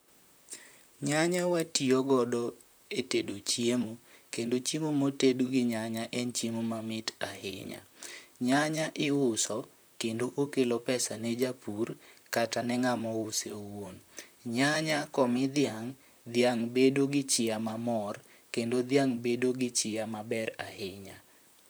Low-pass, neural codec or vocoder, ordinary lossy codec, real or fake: none; vocoder, 44.1 kHz, 128 mel bands, Pupu-Vocoder; none; fake